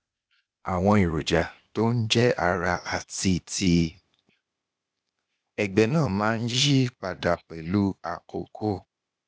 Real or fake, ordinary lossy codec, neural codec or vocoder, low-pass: fake; none; codec, 16 kHz, 0.8 kbps, ZipCodec; none